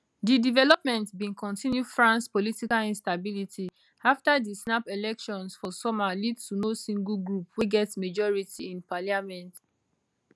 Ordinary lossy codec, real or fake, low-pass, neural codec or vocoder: none; real; none; none